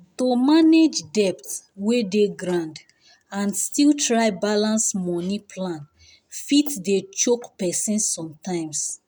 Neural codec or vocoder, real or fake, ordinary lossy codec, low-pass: none; real; none; none